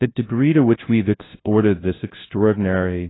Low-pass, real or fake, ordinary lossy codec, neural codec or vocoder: 7.2 kHz; fake; AAC, 16 kbps; codec, 16 kHz, 0.5 kbps, FunCodec, trained on LibriTTS, 25 frames a second